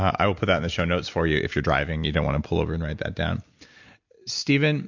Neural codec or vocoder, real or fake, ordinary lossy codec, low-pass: none; real; MP3, 64 kbps; 7.2 kHz